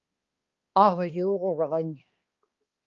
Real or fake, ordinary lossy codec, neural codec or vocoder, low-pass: fake; Opus, 32 kbps; codec, 16 kHz, 2 kbps, X-Codec, HuBERT features, trained on balanced general audio; 7.2 kHz